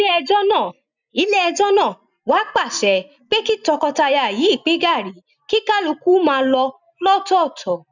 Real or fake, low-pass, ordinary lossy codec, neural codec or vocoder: real; 7.2 kHz; none; none